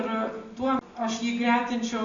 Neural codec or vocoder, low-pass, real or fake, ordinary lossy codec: none; 7.2 kHz; real; AAC, 64 kbps